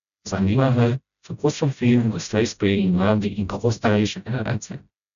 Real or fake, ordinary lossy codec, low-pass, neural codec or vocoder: fake; none; 7.2 kHz; codec, 16 kHz, 0.5 kbps, FreqCodec, smaller model